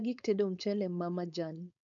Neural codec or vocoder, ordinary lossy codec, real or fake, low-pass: codec, 16 kHz, 4.8 kbps, FACodec; none; fake; 7.2 kHz